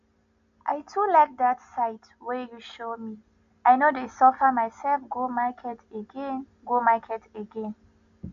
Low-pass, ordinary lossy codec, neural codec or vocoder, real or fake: 7.2 kHz; AAC, 64 kbps; none; real